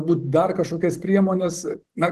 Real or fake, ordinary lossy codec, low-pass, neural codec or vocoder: fake; Opus, 16 kbps; 14.4 kHz; vocoder, 44.1 kHz, 128 mel bands every 512 samples, BigVGAN v2